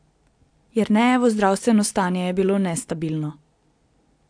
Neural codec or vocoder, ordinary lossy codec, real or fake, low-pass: none; AAC, 64 kbps; real; 9.9 kHz